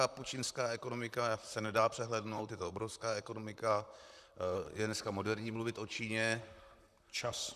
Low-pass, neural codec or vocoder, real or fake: 14.4 kHz; vocoder, 44.1 kHz, 128 mel bands, Pupu-Vocoder; fake